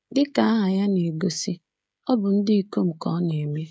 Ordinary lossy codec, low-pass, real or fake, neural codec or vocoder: none; none; fake; codec, 16 kHz, 16 kbps, FreqCodec, smaller model